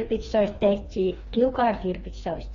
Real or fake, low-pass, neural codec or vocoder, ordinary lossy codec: fake; 7.2 kHz; codec, 16 kHz, 2 kbps, FreqCodec, larger model; AAC, 32 kbps